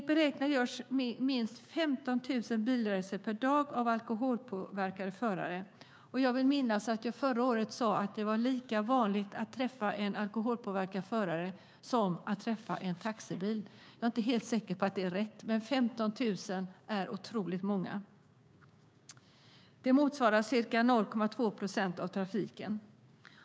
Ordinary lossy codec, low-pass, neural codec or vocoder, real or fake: none; none; codec, 16 kHz, 6 kbps, DAC; fake